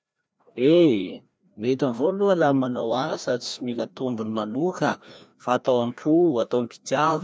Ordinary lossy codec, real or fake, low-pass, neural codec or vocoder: none; fake; none; codec, 16 kHz, 1 kbps, FreqCodec, larger model